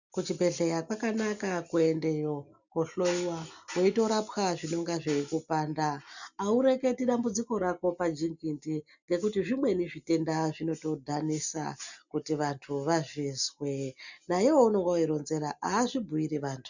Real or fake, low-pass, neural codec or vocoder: real; 7.2 kHz; none